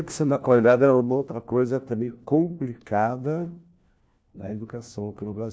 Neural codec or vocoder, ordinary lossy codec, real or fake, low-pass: codec, 16 kHz, 1 kbps, FunCodec, trained on LibriTTS, 50 frames a second; none; fake; none